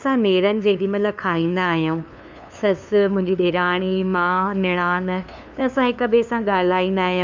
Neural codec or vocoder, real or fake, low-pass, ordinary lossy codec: codec, 16 kHz, 2 kbps, FunCodec, trained on LibriTTS, 25 frames a second; fake; none; none